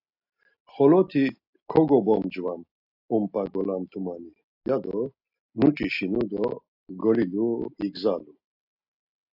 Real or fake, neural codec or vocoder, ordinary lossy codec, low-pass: real; none; AAC, 48 kbps; 5.4 kHz